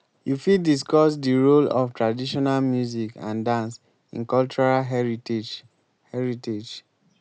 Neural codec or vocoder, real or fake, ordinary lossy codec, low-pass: none; real; none; none